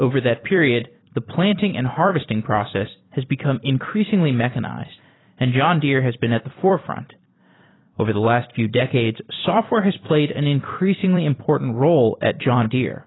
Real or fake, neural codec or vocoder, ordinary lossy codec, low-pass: fake; vocoder, 44.1 kHz, 128 mel bands every 256 samples, BigVGAN v2; AAC, 16 kbps; 7.2 kHz